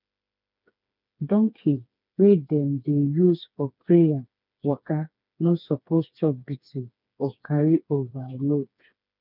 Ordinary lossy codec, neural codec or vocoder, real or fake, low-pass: none; codec, 16 kHz, 4 kbps, FreqCodec, smaller model; fake; 5.4 kHz